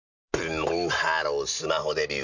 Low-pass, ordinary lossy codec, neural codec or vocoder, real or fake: 7.2 kHz; none; none; real